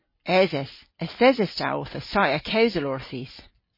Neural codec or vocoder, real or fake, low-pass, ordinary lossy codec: none; real; 5.4 kHz; MP3, 24 kbps